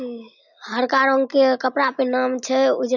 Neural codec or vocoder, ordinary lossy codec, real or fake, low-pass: none; none; real; 7.2 kHz